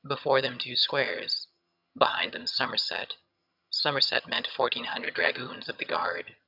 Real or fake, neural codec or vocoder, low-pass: fake; vocoder, 22.05 kHz, 80 mel bands, HiFi-GAN; 5.4 kHz